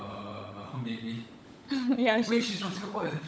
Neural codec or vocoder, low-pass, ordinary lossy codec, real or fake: codec, 16 kHz, 16 kbps, FunCodec, trained on LibriTTS, 50 frames a second; none; none; fake